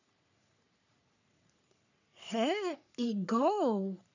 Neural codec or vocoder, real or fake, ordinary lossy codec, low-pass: codec, 44.1 kHz, 3.4 kbps, Pupu-Codec; fake; none; 7.2 kHz